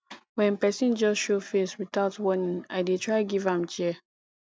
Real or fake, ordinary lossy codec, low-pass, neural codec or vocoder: real; none; none; none